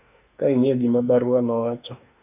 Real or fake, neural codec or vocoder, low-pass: fake; autoencoder, 48 kHz, 32 numbers a frame, DAC-VAE, trained on Japanese speech; 3.6 kHz